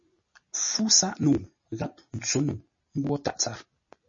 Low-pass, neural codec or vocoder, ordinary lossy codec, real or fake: 7.2 kHz; none; MP3, 32 kbps; real